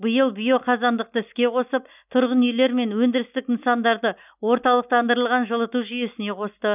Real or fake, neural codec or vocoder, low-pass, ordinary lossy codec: real; none; 3.6 kHz; none